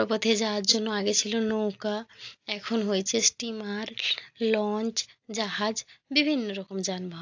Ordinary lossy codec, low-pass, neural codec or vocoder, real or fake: AAC, 48 kbps; 7.2 kHz; none; real